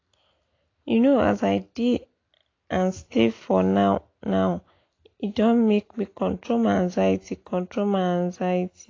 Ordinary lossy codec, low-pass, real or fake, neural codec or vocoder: AAC, 32 kbps; 7.2 kHz; real; none